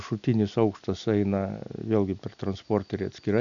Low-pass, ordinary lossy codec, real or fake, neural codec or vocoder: 7.2 kHz; MP3, 96 kbps; real; none